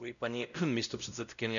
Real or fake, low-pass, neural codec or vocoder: fake; 7.2 kHz; codec, 16 kHz, 0.5 kbps, X-Codec, WavLM features, trained on Multilingual LibriSpeech